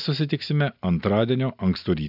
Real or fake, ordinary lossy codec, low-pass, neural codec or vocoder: real; AAC, 48 kbps; 5.4 kHz; none